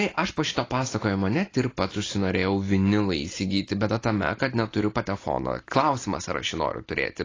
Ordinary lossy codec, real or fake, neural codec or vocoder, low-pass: AAC, 32 kbps; real; none; 7.2 kHz